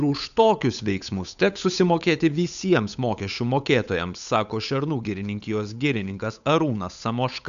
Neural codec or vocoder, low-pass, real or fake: codec, 16 kHz, 8 kbps, FunCodec, trained on Chinese and English, 25 frames a second; 7.2 kHz; fake